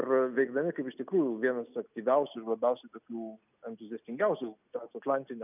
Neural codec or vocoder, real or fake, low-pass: none; real; 3.6 kHz